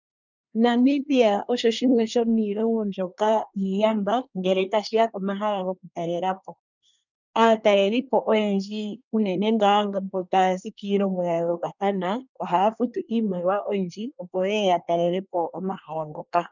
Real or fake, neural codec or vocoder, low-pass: fake; codec, 24 kHz, 1 kbps, SNAC; 7.2 kHz